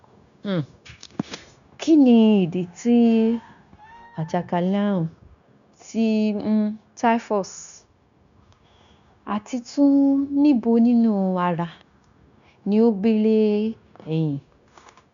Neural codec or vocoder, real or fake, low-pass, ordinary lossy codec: codec, 16 kHz, 0.9 kbps, LongCat-Audio-Codec; fake; 7.2 kHz; none